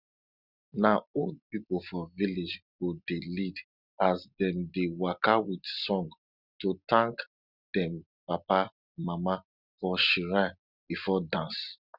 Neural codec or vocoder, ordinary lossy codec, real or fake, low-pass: none; Opus, 64 kbps; real; 5.4 kHz